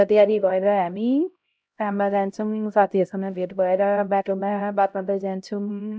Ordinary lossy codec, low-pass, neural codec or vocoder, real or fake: none; none; codec, 16 kHz, 0.5 kbps, X-Codec, HuBERT features, trained on LibriSpeech; fake